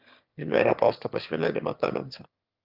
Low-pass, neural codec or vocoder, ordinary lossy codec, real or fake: 5.4 kHz; autoencoder, 22.05 kHz, a latent of 192 numbers a frame, VITS, trained on one speaker; Opus, 24 kbps; fake